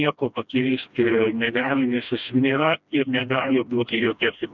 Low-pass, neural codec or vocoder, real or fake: 7.2 kHz; codec, 16 kHz, 1 kbps, FreqCodec, smaller model; fake